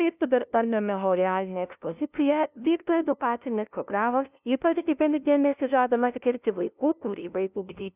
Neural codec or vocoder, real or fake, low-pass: codec, 16 kHz, 0.5 kbps, FunCodec, trained on LibriTTS, 25 frames a second; fake; 3.6 kHz